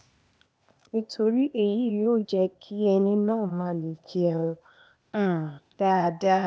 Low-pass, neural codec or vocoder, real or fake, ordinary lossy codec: none; codec, 16 kHz, 0.8 kbps, ZipCodec; fake; none